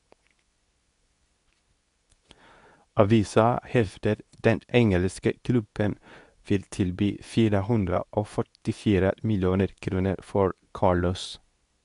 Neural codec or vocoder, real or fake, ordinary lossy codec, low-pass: codec, 24 kHz, 0.9 kbps, WavTokenizer, medium speech release version 2; fake; none; 10.8 kHz